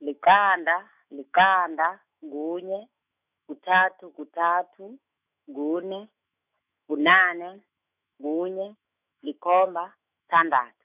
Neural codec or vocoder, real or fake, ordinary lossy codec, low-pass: autoencoder, 48 kHz, 128 numbers a frame, DAC-VAE, trained on Japanese speech; fake; none; 3.6 kHz